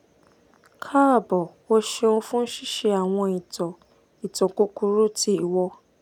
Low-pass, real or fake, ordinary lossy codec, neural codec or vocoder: 19.8 kHz; real; none; none